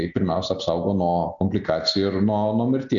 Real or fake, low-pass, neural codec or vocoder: real; 7.2 kHz; none